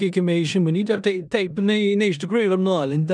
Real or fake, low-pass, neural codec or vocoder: fake; 9.9 kHz; codec, 16 kHz in and 24 kHz out, 0.9 kbps, LongCat-Audio-Codec, four codebook decoder